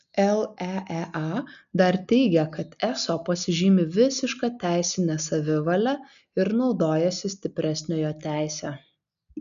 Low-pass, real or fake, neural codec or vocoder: 7.2 kHz; real; none